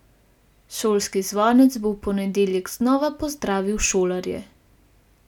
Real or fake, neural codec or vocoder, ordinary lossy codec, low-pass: real; none; none; 19.8 kHz